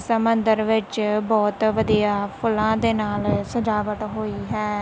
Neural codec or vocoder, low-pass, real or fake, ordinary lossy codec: none; none; real; none